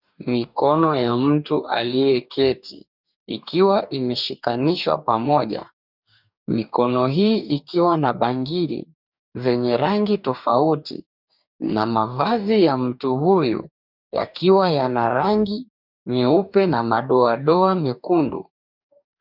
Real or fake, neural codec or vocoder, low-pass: fake; codec, 44.1 kHz, 2.6 kbps, DAC; 5.4 kHz